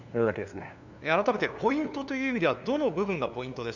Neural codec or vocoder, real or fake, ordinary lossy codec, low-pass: codec, 16 kHz, 2 kbps, FunCodec, trained on LibriTTS, 25 frames a second; fake; none; 7.2 kHz